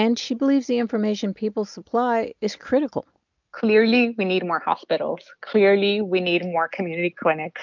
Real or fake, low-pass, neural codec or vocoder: real; 7.2 kHz; none